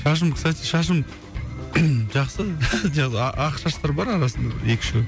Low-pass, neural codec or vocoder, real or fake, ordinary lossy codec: none; none; real; none